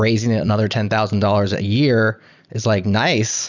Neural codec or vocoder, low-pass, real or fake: vocoder, 44.1 kHz, 128 mel bands every 256 samples, BigVGAN v2; 7.2 kHz; fake